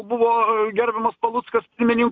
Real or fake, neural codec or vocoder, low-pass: real; none; 7.2 kHz